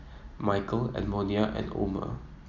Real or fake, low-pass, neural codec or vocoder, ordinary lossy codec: real; 7.2 kHz; none; none